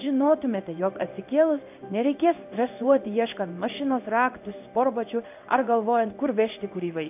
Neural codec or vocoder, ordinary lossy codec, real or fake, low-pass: codec, 16 kHz in and 24 kHz out, 1 kbps, XY-Tokenizer; AAC, 32 kbps; fake; 3.6 kHz